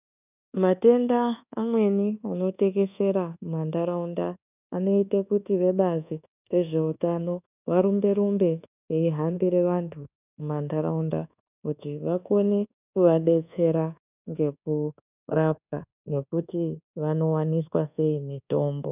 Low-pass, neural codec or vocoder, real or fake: 3.6 kHz; codec, 24 kHz, 1.2 kbps, DualCodec; fake